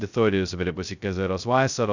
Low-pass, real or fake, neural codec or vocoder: 7.2 kHz; fake; codec, 16 kHz, 0.2 kbps, FocalCodec